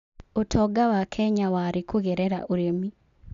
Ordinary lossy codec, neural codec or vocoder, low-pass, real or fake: none; none; 7.2 kHz; real